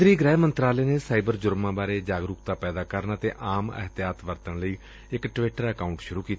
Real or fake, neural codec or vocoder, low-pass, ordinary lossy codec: real; none; none; none